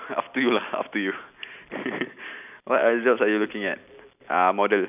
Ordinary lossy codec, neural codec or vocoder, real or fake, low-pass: none; none; real; 3.6 kHz